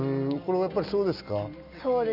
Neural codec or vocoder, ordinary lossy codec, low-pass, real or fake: none; none; 5.4 kHz; real